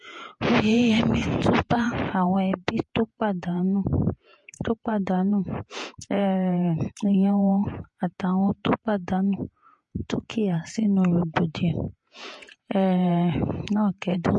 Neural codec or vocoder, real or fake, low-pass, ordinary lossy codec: vocoder, 48 kHz, 128 mel bands, Vocos; fake; 10.8 kHz; MP3, 48 kbps